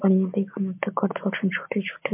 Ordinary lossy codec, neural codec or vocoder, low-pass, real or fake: MP3, 32 kbps; none; 3.6 kHz; real